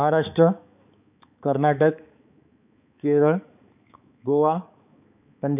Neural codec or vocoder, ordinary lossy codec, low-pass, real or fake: codec, 16 kHz, 4 kbps, X-Codec, HuBERT features, trained on balanced general audio; none; 3.6 kHz; fake